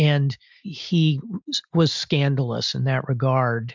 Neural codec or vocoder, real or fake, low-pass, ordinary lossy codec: none; real; 7.2 kHz; MP3, 64 kbps